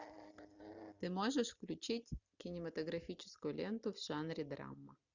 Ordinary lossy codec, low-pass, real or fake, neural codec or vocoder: Opus, 64 kbps; 7.2 kHz; real; none